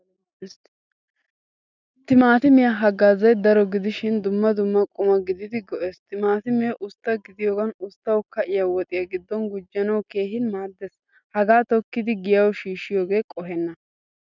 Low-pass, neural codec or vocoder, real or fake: 7.2 kHz; none; real